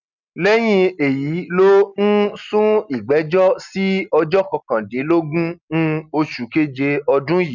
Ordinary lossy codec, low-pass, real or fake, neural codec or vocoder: none; 7.2 kHz; real; none